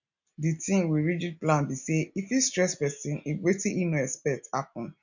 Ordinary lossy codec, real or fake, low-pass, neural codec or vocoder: none; real; 7.2 kHz; none